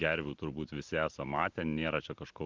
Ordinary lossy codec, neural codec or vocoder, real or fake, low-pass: Opus, 32 kbps; none; real; 7.2 kHz